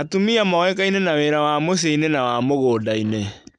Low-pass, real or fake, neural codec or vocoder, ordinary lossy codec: 9.9 kHz; real; none; none